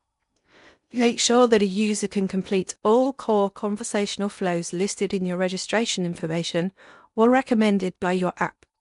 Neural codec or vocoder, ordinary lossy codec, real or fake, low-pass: codec, 16 kHz in and 24 kHz out, 0.8 kbps, FocalCodec, streaming, 65536 codes; none; fake; 10.8 kHz